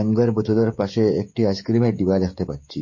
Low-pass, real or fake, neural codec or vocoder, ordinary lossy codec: 7.2 kHz; fake; codec, 16 kHz, 16 kbps, FreqCodec, smaller model; MP3, 32 kbps